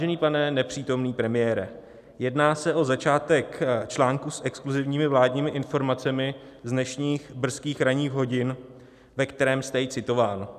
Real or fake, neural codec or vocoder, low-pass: real; none; 14.4 kHz